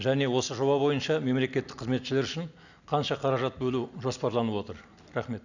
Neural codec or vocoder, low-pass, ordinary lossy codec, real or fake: none; 7.2 kHz; none; real